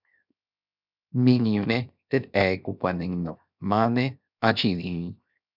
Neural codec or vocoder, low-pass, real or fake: codec, 16 kHz, 0.7 kbps, FocalCodec; 5.4 kHz; fake